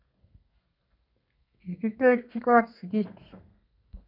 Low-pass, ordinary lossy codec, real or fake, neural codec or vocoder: 5.4 kHz; none; fake; codec, 32 kHz, 1.9 kbps, SNAC